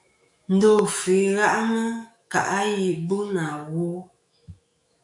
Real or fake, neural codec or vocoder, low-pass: fake; autoencoder, 48 kHz, 128 numbers a frame, DAC-VAE, trained on Japanese speech; 10.8 kHz